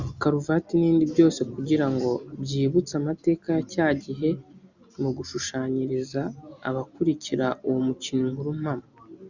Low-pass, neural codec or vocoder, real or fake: 7.2 kHz; none; real